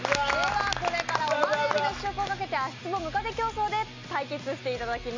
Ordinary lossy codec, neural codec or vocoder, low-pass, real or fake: MP3, 64 kbps; none; 7.2 kHz; real